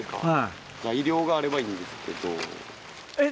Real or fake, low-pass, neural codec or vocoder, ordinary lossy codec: real; none; none; none